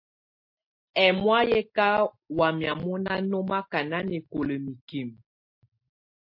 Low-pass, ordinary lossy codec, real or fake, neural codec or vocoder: 5.4 kHz; MP3, 32 kbps; real; none